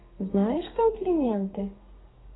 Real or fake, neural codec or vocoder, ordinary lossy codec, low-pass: fake; codec, 32 kHz, 1.9 kbps, SNAC; AAC, 16 kbps; 7.2 kHz